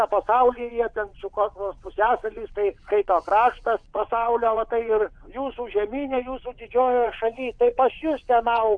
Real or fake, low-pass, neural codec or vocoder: fake; 9.9 kHz; vocoder, 22.05 kHz, 80 mel bands, WaveNeXt